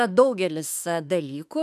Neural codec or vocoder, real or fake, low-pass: autoencoder, 48 kHz, 32 numbers a frame, DAC-VAE, trained on Japanese speech; fake; 14.4 kHz